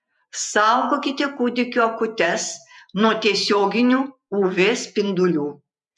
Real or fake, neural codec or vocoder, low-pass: fake; vocoder, 48 kHz, 128 mel bands, Vocos; 10.8 kHz